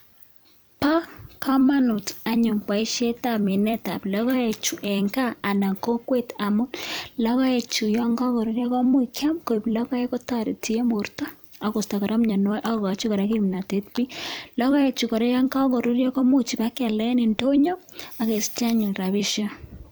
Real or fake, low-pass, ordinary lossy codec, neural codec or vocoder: fake; none; none; vocoder, 44.1 kHz, 128 mel bands every 256 samples, BigVGAN v2